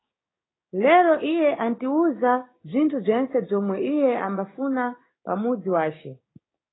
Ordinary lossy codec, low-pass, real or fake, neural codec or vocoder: AAC, 16 kbps; 7.2 kHz; fake; codec, 16 kHz, 6 kbps, DAC